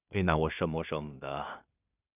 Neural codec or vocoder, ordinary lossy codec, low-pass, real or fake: codec, 16 kHz in and 24 kHz out, 0.4 kbps, LongCat-Audio-Codec, two codebook decoder; none; 3.6 kHz; fake